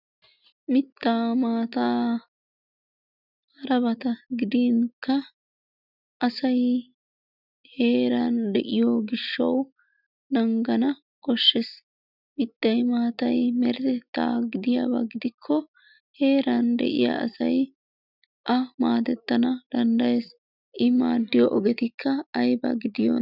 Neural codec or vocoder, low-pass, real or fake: none; 5.4 kHz; real